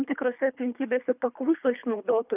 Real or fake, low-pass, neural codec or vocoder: fake; 3.6 kHz; codec, 24 kHz, 3 kbps, HILCodec